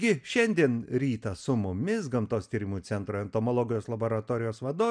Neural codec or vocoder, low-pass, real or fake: none; 9.9 kHz; real